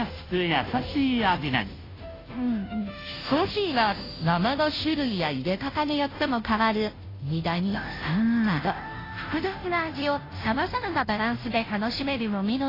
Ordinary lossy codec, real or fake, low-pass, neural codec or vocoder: AAC, 24 kbps; fake; 5.4 kHz; codec, 16 kHz, 0.5 kbps, FunCodec, trained on Chinese and English, 25 frames a second